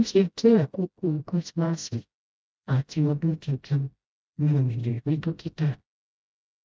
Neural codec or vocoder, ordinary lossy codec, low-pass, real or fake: codec, 16 kHz, 0.5 kbps, FreqCodec, smaller model; none; none; fake